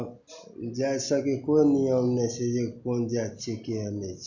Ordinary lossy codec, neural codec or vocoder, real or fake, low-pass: none; none; real; 7.2 kHz